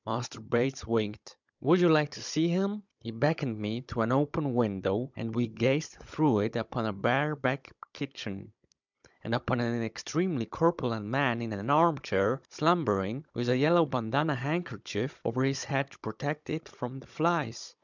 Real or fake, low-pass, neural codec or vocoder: fake; 7.2 kHz; codec, 16 kHz, 16 kbps, FunCodec, trained on Chinese and English, 50 frames a second